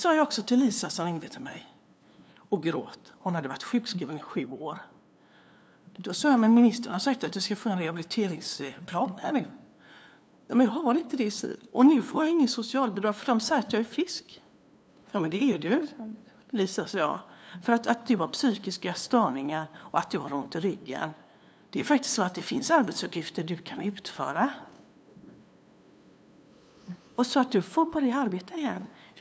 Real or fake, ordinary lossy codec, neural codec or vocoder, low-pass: fake; none; codec, 16 kHz, 2 kbps, FunCodec, trained on LibriTTS, 25 frames a second; none